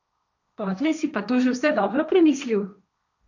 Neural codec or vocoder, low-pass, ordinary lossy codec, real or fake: codec, 16 kHz, 1.1 kbps, Voila-Tokenizer; 7.2 kHz; none; fake